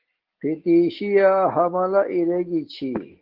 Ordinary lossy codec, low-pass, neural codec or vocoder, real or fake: Opus, 16 kbps; 5.4 kHz; none; real